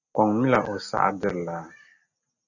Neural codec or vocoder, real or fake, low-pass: none; real; 7.2 kHz